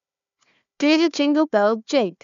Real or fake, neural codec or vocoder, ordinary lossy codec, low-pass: fake; codec, 16 kHz, 1 kbps, FunCodec, trained on Chinese and English, 50 frames a second; none; 7.2 kHz